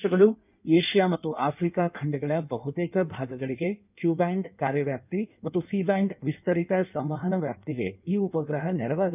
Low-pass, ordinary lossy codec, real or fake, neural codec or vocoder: 3.6 kHz; none; fake; codec, 16 kHz in and 24 kHz out, 1.1 kbps, FireRedTTS-2 codec